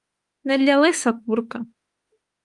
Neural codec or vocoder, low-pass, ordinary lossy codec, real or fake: autoencoder, 48 kHz, 32 numbers a frame, DAC-VAE, trained on Japanese speech; 10.8 kHz; Opus, 32 kbps; fake